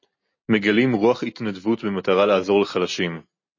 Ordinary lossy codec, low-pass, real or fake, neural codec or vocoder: MP3, 32 kbps; 7.2 kHz; real; none